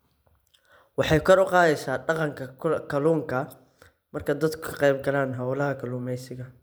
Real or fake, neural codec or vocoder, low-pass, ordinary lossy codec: fake; vocoder, 44.1 kHz, 128 mel bands every 512 samples, BigVGAN v2; none; none